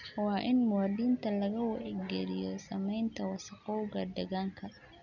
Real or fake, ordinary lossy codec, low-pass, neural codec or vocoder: real; none; 7.2 kHz; none